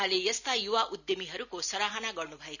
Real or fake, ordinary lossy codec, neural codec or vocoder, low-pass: real; none; none; 7.2 kHz